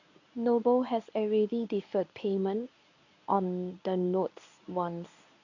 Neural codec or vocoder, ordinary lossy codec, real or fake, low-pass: codec, 24 kHz, 0.9 kbps, WavTokenizer, medium speech release version 1; none; fake; 7.2 kHz